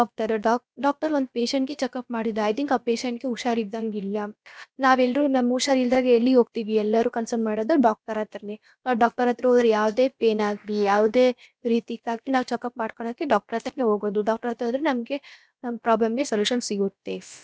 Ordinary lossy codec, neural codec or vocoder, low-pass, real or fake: none; codec, 16 kHz, about 1 kbps, DyCAST, with the encoder's durations; none; fake